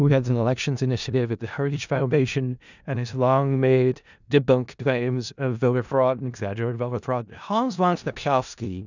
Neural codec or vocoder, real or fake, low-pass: codec, 16 kHz in and 24 kHz out, 0.4 kbps, LongCat-Audio-Codec, four codebook decoder; fake; 7.2 kHz